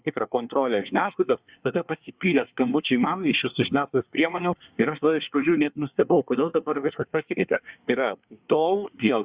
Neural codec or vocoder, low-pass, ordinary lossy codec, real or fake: codec, 24 kHz, 1 kbps, SNAC; 3.6 kHz; Opus, 64 kbps; fake